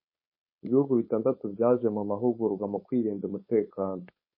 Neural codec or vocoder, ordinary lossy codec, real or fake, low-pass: codec, 16 kHz, 4.8 kbps, FACodec; MP3, 24 kbps; fake; 5.4 kHz